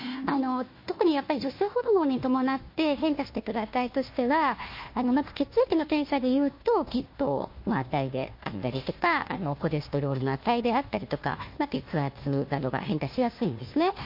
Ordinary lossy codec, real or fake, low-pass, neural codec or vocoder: MP3, 32 kbps; fake; 5.4 kHz; codec, 16 kHz, 1 kbps, FunCodec, trained on Chinese and English, 50 frames a second